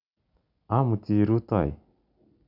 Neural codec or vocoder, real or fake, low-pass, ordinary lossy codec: none; real; 5.4 kHz; none